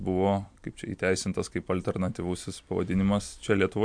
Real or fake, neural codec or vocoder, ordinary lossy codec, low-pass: fake; vocoder, 44.1 kHz, 128 mel bands every 256 samples, BigVGAN v2; MP3, 64 kbps; 9.9 kHz